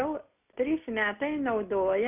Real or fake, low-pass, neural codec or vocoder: real; 3.6 kHz; none